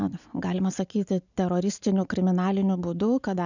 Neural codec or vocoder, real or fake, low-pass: none; real; 7.2 kHz